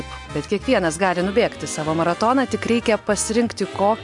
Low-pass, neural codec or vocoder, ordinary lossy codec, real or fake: 10.8 kHz; none; MP3, 64 kbps; real